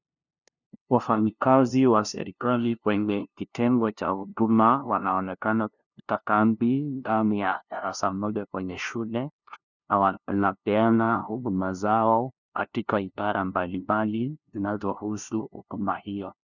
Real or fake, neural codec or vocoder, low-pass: fake; codec, 16 kHz, 0.5 kbps, FunCodec, trained on LibriTTS, 25 frames a second; 7.2 kHz